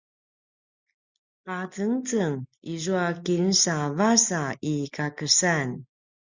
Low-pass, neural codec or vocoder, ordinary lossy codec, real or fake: 7.2 kHz; none; Opus, 64 kbps; real